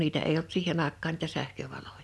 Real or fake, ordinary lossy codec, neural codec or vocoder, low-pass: real; none; none; none